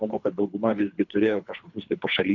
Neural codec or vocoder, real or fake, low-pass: codec, 24 kHz, 3 kbps, HILCodec; fake; 7.2 kHz